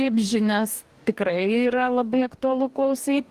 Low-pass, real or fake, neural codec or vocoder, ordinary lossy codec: 14.4 kHz; fake; codec, 44.1 kHz, 2.6 kbps, DAC; Opus, 24 kbps